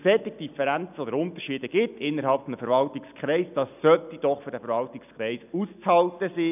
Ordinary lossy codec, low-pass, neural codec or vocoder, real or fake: none; 3.6 kHz; none; real